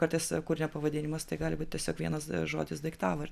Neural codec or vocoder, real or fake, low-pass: none; real; 14.4 kHz